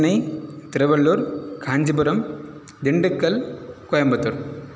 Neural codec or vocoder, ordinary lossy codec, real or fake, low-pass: none; none; real; none